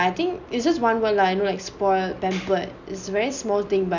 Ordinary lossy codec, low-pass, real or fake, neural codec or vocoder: none; 7.2 kHz; real; none